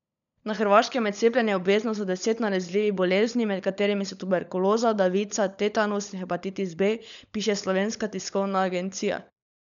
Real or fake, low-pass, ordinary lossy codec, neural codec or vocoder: fake; 7.2 kHz; none; codec, 16 kHz, 16 kbps, FunCodec, trained on LibriTTS, 50 frames a second